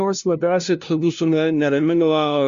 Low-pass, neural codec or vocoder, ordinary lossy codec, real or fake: 7.2 kHz; codec, 16 kHz, 0.5 kbps, FunCodec, trained on LibriTTS, 25 frames a second; Opus, 64 kbps; fake